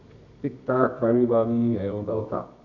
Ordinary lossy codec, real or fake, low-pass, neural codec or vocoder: none; fake; 7.2 kHz; codec, 24 kHz, 0.9 kbps, WavTokenizer, medium music audio release